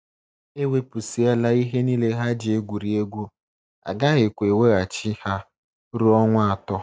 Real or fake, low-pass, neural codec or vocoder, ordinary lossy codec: real; none; none; none